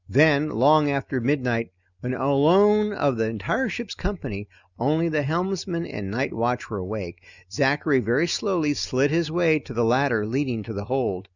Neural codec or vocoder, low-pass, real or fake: none; 7.2 kHz; real